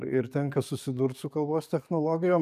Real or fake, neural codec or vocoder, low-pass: fake; codec, 44.1 kHz, 7.8 kbps, DAC; 14.4 kHz